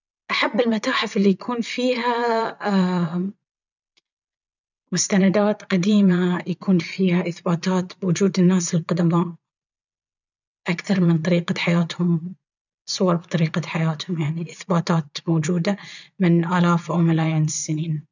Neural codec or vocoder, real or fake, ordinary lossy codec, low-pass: vocoder, 22.05 kHz, 80 mel bands, Vocos; fake; none; 7.2 kHz